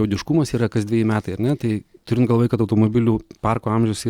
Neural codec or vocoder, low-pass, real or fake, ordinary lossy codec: none; 19.8 kHz; real; Opus, 64 kbps